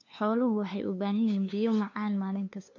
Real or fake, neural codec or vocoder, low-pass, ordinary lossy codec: fake; codec, 16 kHz, 2 kbps, FunCodec, trained on LibriTTS, 25 frames a second; 7.2 kHz; MP3, 48 kbps